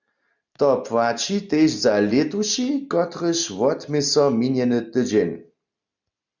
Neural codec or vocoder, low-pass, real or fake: none; 7.2 kHz; real